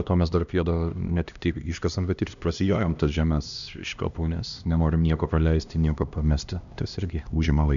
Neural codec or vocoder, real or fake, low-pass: codec, 16 kHz, 1 kbps, X-Codec, HuBERT features, trained on LibriSpeech; fake; 7.2 kHz